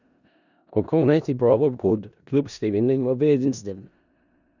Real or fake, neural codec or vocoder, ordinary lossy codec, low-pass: fake; codec, 16 kHz in and 24 kHz out, 0.4 kbps, LongCat-Audio-Codec, four codebook decoder; none; 7.2 kHz